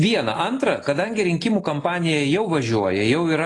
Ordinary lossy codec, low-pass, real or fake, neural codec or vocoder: AAC, 32 kbps; 10.8 kHz; real; none